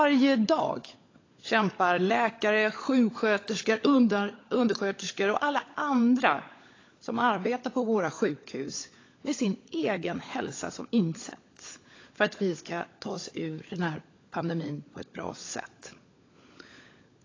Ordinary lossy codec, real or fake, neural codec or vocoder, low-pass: AAC, 32 kbps; fake; codec, 16 kHz, 16 kbps, FunCodec, trained on LibriTTS, 50 frames a second; 7.2 kHz